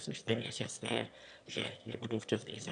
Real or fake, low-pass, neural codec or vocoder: fake; 9.9 kHz; autoencoder, 22.05 kHz, a latent of 192 numbers a frame, VITS, trained on one speaker